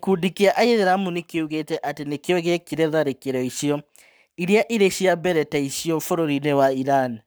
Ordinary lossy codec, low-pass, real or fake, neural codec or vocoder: none; none; fake; codec, 44.1 kHz, 7.8 kbps, Pupu-Codec